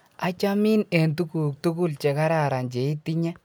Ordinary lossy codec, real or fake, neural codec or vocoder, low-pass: none; real; none; none